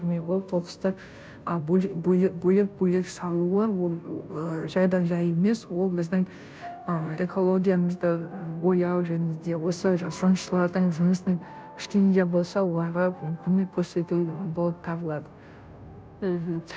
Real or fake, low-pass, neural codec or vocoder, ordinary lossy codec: fake; none; codec, 16 kHz, 0.5 kbps, FunCodec, trained on Chinese and English, 25 frames a second; none